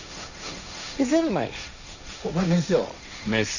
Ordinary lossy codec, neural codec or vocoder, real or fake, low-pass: none; codec, 16 kHz, 1.1 kbps, Voila-Tokenizer; fake; 7.2 kHz